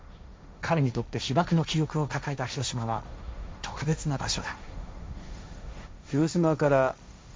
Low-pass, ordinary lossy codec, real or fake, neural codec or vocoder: none; none; fake; codec, 16 kHz, 1.1 kbps, Voila-Tokenizer